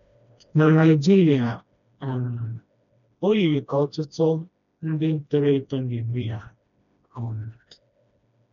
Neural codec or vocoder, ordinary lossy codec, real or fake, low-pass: codec, 16 kHz, 1 kbps, FreqCodec, smaller model; none; fake; 7.2 kHz